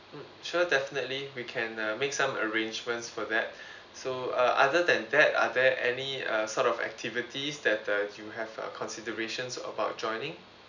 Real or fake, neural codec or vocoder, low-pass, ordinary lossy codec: real; none; 7.2 kHz; none